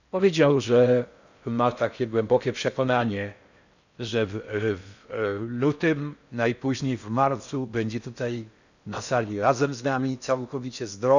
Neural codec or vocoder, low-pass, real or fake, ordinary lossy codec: codec, 16 kHz in and 24 kHz out, 0.6 kbps, FocalCodec, streaming, 4096 codes; 7.2 kHz; fake; none